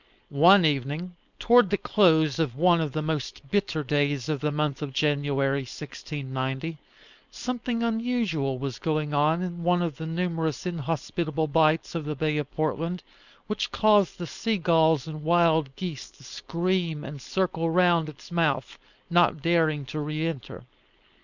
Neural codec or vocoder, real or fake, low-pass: codec, 16 kHz, 4.8 kbps, FACodec; fake; 7.2 kHz